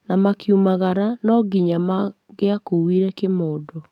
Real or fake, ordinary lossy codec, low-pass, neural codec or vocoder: fake; none; 19.8 kHz; codec, 44.1 kHz, 7.8 kbps, DAC